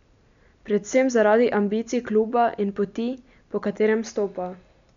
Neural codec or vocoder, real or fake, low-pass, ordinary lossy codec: none; real; 7.2 kHz; none